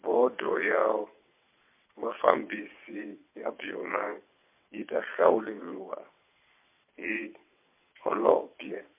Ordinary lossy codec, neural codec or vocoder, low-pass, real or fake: MP3, 24 kbps; vocoder, 22.05 kHz, 80 mel bands, WaveNeXt; 3.6 kHz; fake